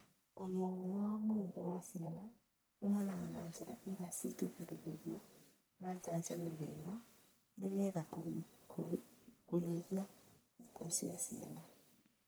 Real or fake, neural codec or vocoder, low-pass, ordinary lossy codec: fake; codec, 44.1 kHz, 1.7 kbps, Pupu-Codec; none; none